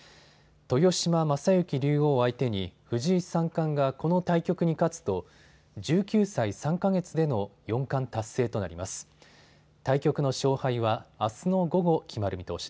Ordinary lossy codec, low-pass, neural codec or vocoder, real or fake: none; none; none; real